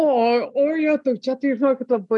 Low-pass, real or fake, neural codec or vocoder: 10.8 kHz; real; none